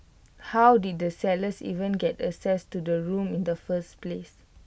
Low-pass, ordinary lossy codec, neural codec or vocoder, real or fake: none; none; none; real